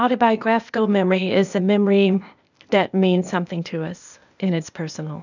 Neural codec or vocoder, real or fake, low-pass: codec, 16 kHz, 0.8 kbps, ZipCodec; fake; 7.2 kHz